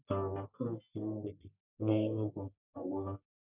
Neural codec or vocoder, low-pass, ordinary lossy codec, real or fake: codec, 44.1 kHz, 1.7 kbps, Pupu-Codec; 3.6 kHz; none; fake